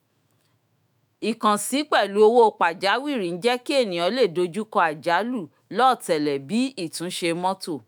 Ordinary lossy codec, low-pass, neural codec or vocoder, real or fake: none; none; autoencoder, 48 kHz, 128 numbers a frame, DAC-VAE, trained on Japanese speech; fake